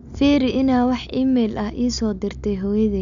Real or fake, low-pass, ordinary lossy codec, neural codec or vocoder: real; 7.2 kHz; none; none